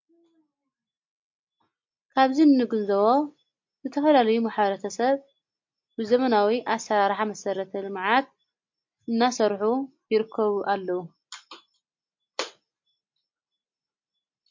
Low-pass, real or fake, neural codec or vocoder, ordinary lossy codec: 7.2 kHz; real; none; MP3, 48 kbps